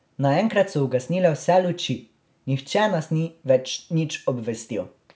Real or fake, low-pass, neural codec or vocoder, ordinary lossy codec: real; none; none; none